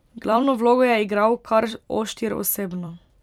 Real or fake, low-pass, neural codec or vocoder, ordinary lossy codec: fake; 19.8 kHz; vocoder, 44.1 kHz, 128 mel bands every 256 samples, BigVGAN v2; none